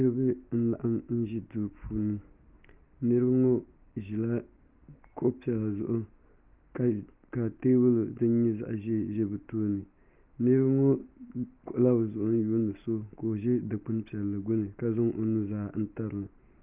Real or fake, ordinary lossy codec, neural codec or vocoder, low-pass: real; Opus, 32 kbps; none; 3.6 kHz